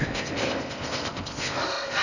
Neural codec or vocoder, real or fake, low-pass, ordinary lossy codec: codec, 16 kHz in and 24 kHz out, 0.6 kbps, FocalCodec, streaming, 4096 codes; fake; 7.2 kHz; none